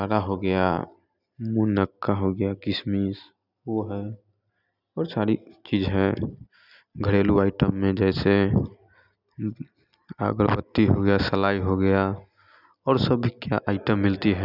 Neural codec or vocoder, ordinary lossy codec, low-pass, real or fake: none; none; 5.4 kHz; real